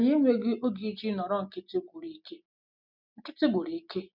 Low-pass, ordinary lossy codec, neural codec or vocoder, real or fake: 5.4 kHz; none; none; real